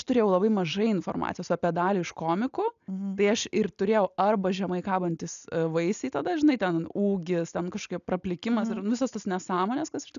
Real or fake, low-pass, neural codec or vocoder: real; 7.2 kHz; none